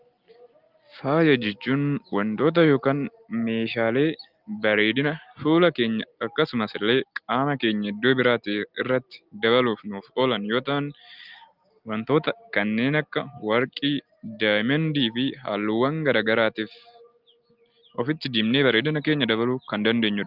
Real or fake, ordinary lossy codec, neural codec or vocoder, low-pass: real; Opus, 32 kbps; none; 5.4 kHz